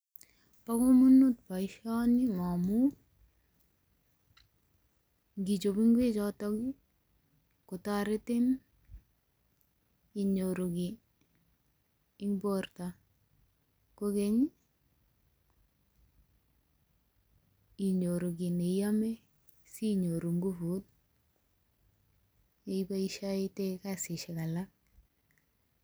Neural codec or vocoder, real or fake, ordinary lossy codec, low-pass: none; real; none; none